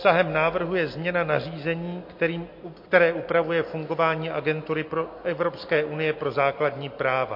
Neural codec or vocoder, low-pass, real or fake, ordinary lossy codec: none; 5.4 kHz; real; MP3, 32 kbps